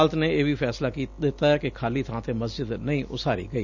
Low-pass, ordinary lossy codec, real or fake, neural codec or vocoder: 7.2 kHz; none; real; none